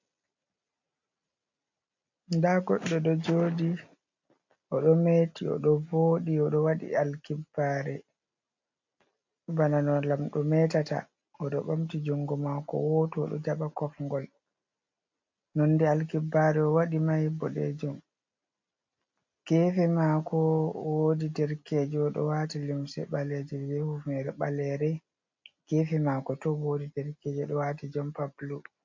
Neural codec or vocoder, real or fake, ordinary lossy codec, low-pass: none; real; MP3, 48 kbps; 7.2 kHz